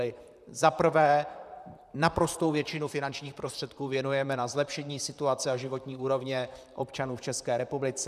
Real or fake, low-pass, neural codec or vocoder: fake; 14.4 kHz; vocoder, 44.1 kHz, 128 mel bands, Pupu-Vocoder